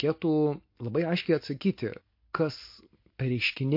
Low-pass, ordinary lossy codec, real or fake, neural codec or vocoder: 5.4 kHz; MP3, 32 kbps; real; none